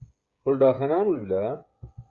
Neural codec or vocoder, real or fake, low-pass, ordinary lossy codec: codec, 16 kHz, 8 kbps, FreqCodec, smaller model; fake; 7.2 kHz; Opus, 64 kbps